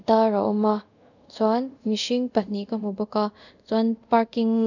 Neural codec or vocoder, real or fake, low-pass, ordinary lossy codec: codec, 24 kHz, 0.5 kbps, DualCodec; fake; 7.2 kHz; none